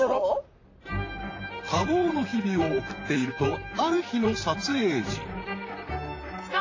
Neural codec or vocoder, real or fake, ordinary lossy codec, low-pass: vocoder, 44.1 kHz, 128 mel bands, Pupu-Vocoder; fake; AAC, 32 kbps; 7.2 kHz